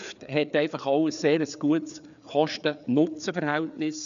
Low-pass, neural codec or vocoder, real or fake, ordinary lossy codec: 7.2 kHz; codec, 16 kHz, 4 kbps, FreqCodec, larger model; fake; none